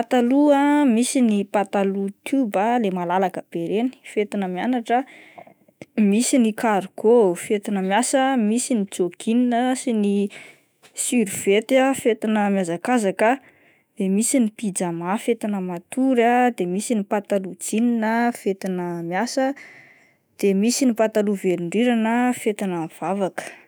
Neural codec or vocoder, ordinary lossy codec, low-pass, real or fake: autoencoder, 48 kHz, 128 numbers a frame, DAC-VAE, trained on Japanese speech; none; none; fake